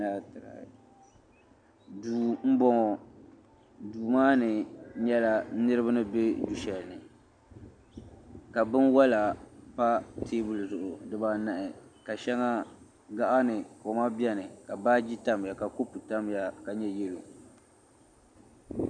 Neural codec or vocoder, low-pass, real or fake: none; 9.9 kHz; real